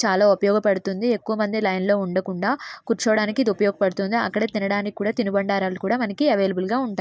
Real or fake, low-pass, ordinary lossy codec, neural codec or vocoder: real; none; none; none